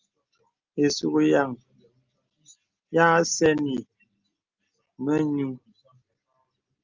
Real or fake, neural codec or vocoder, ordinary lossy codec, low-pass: real; none; Opus, 32 kbps; 7.2 kHz